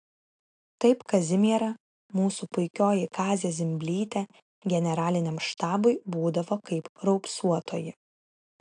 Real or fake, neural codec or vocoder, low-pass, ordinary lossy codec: real; none; 9.9 kHz; AAC, 64 kbps